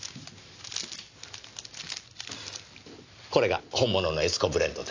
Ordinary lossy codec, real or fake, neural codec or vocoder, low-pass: AAC, 48 kbps; real; none; 7.2 kHz